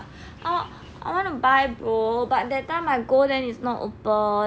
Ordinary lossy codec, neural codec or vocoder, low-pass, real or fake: none; none; none; real